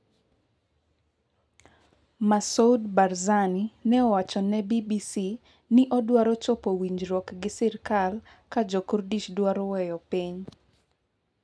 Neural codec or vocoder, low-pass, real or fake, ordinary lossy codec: none; none; real; none